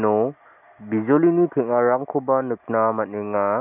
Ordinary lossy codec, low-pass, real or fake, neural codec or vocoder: none; 3.6 kHz; real; none